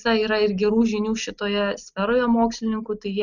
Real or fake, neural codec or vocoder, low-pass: real; none; 7.2 kHz